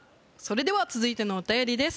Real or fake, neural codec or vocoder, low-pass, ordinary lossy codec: real; none; none; none